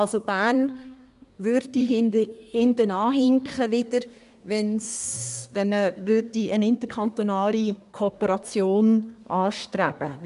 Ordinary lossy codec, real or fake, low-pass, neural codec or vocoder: none; fake; 10.8 kHz; codec, 24 kHz, 1 kbps, SNAC